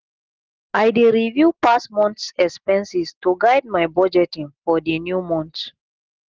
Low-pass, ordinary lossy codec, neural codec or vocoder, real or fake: 7.2 kHz; Opus, 16 kbps; none; real